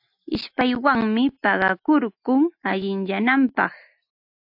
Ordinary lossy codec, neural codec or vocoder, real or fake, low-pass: AAC, 48 kbps; none; real; 5.4 kHz